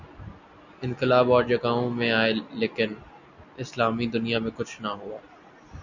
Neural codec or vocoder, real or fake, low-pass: none; real; 7.2 kHz